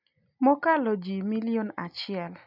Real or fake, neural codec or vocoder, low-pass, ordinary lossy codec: real; none; 5.4 kHz; none